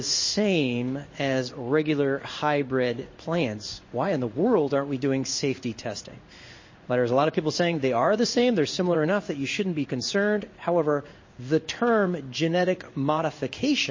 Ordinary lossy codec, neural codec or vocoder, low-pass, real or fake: MP3, 32 kbps; codec, 16 kHz in and 24 kHz out, 1 kbps, XY-Tokenizer; 7.2 kHz; fake